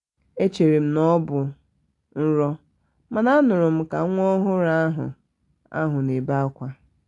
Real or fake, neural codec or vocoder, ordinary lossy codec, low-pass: real; none; AAC, 48 kbps; 10.8 kHz